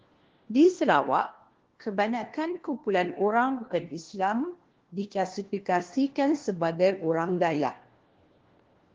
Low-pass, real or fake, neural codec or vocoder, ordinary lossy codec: 7.2 kHz; fake; codec, 16 kHz, 1 kbps, FunCodec, trained on LibriTTS, 50 frames a second; Opus, 16 kbps